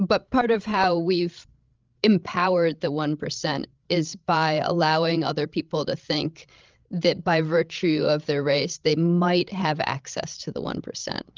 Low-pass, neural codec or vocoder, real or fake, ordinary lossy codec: 7.2 kHz; codec, 16 kHz, 16 kbps, FreqCodec, larger model; fake; Opus, 24 kbps